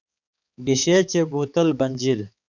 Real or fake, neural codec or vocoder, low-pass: fake; codec, 16 kHz, 4 kbps, X-Codec, HuBERT features, trained on balanced general audio; 7.2 kHz